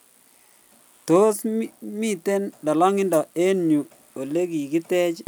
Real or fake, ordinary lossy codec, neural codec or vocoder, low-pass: real; none; none; none